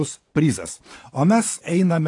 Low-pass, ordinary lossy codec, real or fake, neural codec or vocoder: 10.8 kHz; AAC, 48 kbps; fake; vocoder, 24 kHz, 100 mel bands, Vocos